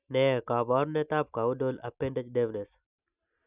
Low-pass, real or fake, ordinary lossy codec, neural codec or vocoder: 3.6 kHz; real; none; none